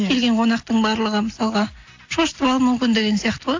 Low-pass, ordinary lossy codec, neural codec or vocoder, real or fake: 7.2 kHz; AAC, 48 kbps; none; real